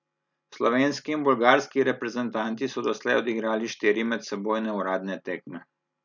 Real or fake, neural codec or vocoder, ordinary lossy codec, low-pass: real; none; none; 7.2 kHz